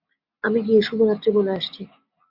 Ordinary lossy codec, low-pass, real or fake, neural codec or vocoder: AAC, 48 kbps; 5.4 kHz; real; none